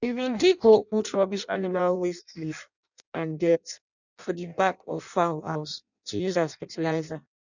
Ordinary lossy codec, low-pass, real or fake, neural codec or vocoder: none; 7.2 kHz; fake; codec, 16 kHz in and 24 kHz out, 0.6 kbps, FireRedTTS-2 codec